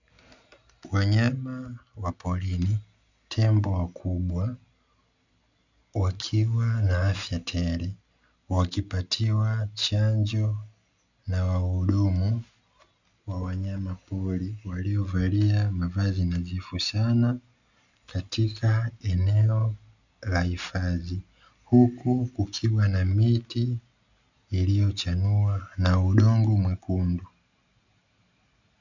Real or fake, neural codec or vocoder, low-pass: real; none; 7.2 kHz